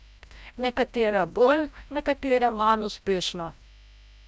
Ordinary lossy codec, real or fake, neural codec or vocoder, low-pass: none; fake; codec, 16 kHz, 0.5 kbps, FreqCodec, larger model; none